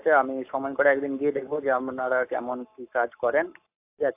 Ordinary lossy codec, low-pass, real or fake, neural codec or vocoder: AAC, 32 kbps; 3.6 kHz; fake; codec, 44.1 kHz, 7.8 kbps, Pupu-Codec